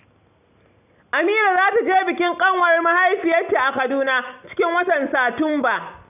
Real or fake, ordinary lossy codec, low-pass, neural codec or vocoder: real; none; 3.6 kHz; none